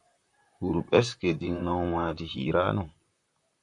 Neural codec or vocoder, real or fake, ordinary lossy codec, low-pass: vocoder, 44.1 kHz, 128 mel bands, Pupu-Vocoder; fake; MP3, 64 kbps; 10.8 kHz